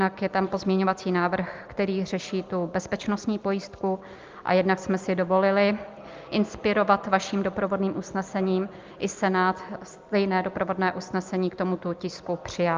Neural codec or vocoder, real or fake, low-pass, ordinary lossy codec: none; real; 7.2 kHz; Opus, 24 kbps